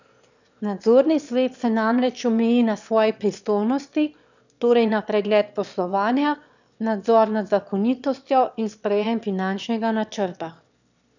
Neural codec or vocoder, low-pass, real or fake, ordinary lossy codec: autoencoder, 22.05 kHz, a latent of 192 numbers a frame, VITS, trained on one speaker; 7.2 kHz; fake; none